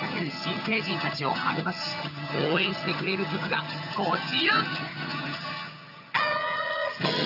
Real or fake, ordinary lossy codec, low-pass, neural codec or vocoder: fake; none; 5.4 kHz; vocoder, 22.05 kHz, 80 mel bands, HiFi-GAN